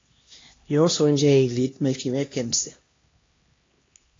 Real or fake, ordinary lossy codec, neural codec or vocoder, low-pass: fake; AAC, 32 kbps; codec, 16 kHz, 1 kbps, X-Codec, HuBERT features, trained on LibriSpeech; 7.2 kHz